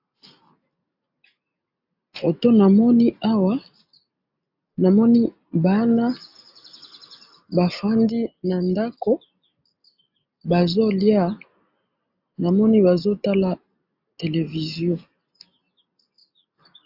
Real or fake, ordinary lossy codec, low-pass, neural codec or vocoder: real; AAC, 48 kbps; 5.4 kHz; none